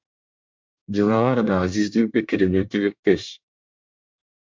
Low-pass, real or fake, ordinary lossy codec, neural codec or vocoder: 7.2 kHz; fake; MP3, 64 kbps; codec, 24 kHz, 1 kbps, SNAC